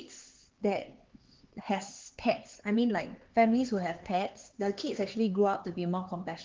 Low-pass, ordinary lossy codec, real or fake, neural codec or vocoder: 7.2 kHz; Opus, 16 kbps; fake; codec, 16 kHz, 4 kbps, X-Codec, HuBERT features, trained on LibriSpeech